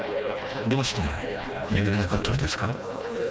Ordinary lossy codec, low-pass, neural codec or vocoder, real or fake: none; none; codec, 16 kHz, 1 kbps, FreqCodec, smaller model; fake